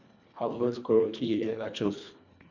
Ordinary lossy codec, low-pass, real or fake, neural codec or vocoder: none; 7.2 kHz; fake; codec, 24 kHz, 1.5 kbps, HILCodec